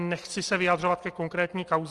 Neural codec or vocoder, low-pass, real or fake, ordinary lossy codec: none; 10.8 kHz; real; Opus, 24 kbps